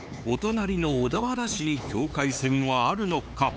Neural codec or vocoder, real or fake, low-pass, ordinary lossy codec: codec, 16 kHz, 4 kbps, X-Codec, HuBERT features, trained on LibriSpeech; fake; none; none